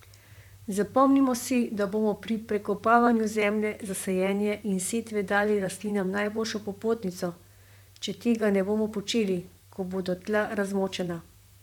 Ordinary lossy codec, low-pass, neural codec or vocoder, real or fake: none; 19.8 kHz; vocoder, 44.1 kHz, 128 mel bands, Pupu-Vocoder; fake